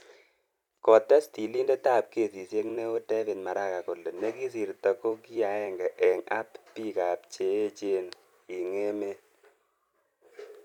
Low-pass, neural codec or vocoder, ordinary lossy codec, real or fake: 19.8 kHz; vocoder, 44.1 kHz, 128 mel bands every 256 samples, BigVGAN v2; none; fake